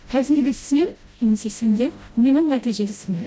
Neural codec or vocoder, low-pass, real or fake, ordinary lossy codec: codec, 16 kHz, 0.5 kbps, FreqCodec, smaller model; none; fake; none